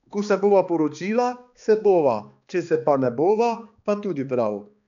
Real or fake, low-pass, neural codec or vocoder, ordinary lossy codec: fake; 7.2 kHz; codec, 16 kHz, 2 kbps, X-Codec, HuBERT features, trained on balanced general audio; none